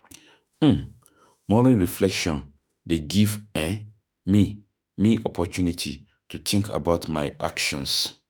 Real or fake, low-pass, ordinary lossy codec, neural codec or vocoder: fake; none; none; autoencoder, 48 kHz, 32 numbers a frame, DAC-VAE, trained on Japanese speech